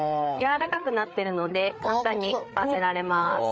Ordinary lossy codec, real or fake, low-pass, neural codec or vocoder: none; fake; none; codec, 16 kHz, 4 kbps, FreqCodec, larger model